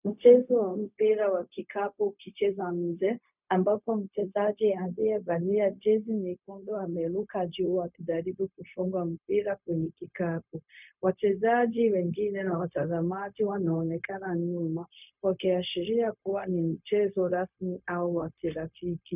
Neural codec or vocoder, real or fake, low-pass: codec, 16 kHz, 0.4 kbps, LongCat-Audio-Codec; fake; 3.6 kHz